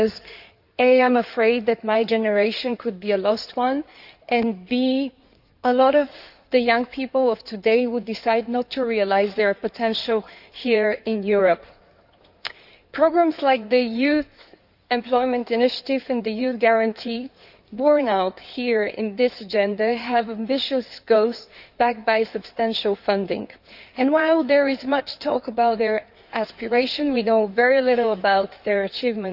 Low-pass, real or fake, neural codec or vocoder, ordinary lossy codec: 5.4 kHz; fake; codec, 16 kHz in and 24 kHz out, 2.2 kbps, FireRedTTS-2 codec; none